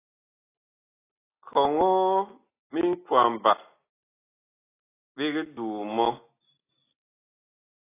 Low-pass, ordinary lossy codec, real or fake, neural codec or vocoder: 3.6 kHz; AAC, 16 kbps; real; none